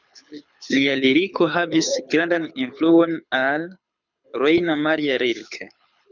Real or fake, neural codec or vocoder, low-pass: fake; codec, 24 kHz, 6 kbps, HILCodec; 7.2 kHz